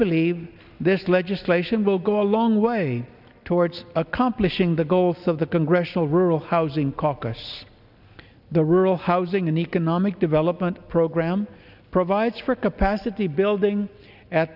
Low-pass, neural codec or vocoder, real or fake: 5.4 kHz; none; real